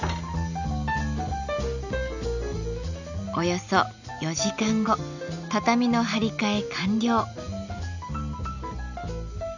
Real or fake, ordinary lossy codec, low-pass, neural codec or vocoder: real; none; 7.2 kHz; none